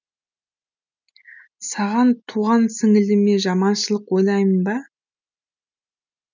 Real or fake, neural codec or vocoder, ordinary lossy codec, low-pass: real; none; none; 7.2 kHz